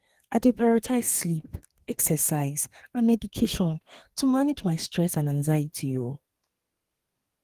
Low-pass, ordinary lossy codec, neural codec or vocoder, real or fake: 14.4 kHz; Opus, 24 kbps; codec, 32 kHz, 1.9 kbps, SNAC; fake